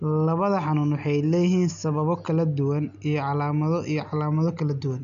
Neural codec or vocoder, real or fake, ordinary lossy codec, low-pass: none; real; none; 7.2 kHz